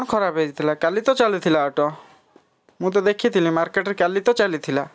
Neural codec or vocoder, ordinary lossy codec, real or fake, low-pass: none; none; real; none